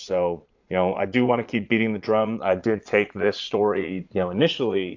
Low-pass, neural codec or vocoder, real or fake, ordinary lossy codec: 7.2 kHz; vocoder, 22.05 kHz, 80 mel bands, Vocos; fake; AAC, 48 kbps